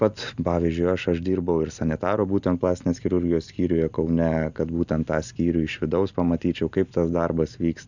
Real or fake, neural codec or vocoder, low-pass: real; none; 7.2 kHz